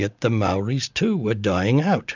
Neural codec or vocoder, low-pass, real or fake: none; 7.2 kHz; real